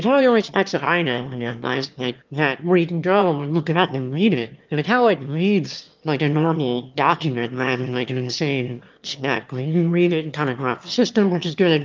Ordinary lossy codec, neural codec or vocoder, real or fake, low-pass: Opus, 24 kbps; autoencoder, 22.05 kHz, a latent of 192 numbers a frame, VITS, trained on one speaker; fake; 7.2 kHz